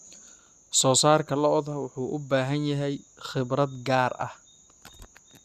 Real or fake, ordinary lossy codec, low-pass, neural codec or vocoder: real; Opus, 64 kbps; 14.4 kHz; none